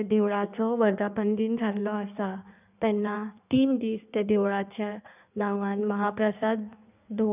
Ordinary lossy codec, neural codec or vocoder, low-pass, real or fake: none; codec, 16 kHz in and 24 kHz out, 1.1 kbps, FireRedTTS-2 codec; 3.6 kHz; fake